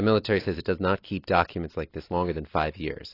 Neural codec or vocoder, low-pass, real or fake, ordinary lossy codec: none; 5.4 kHz; real; AAC, 24 kbps